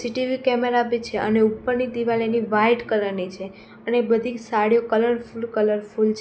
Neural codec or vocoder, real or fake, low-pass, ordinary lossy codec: none; real; none; none